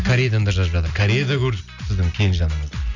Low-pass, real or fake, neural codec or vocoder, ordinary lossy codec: 7.2 kHz; real; none; none